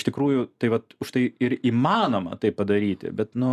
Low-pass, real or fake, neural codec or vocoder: 14.4 kHz; real; none